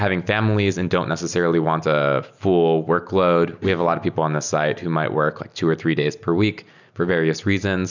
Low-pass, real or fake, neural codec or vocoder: 7.2 kHz; real; none